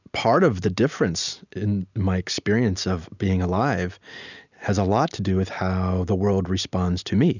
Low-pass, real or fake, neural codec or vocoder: 7.2 kHz; real; none